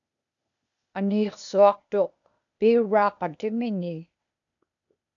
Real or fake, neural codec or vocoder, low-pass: fake; codec, 16 kHz, 0.8 kbps, ZipCodec; 7.2 kHz